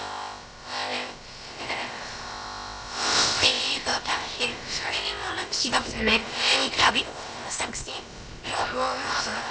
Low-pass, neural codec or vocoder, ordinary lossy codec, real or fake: none; codec, 16 kHz, about 1 kbps, DyCAST, with the encoder's durations; none; fake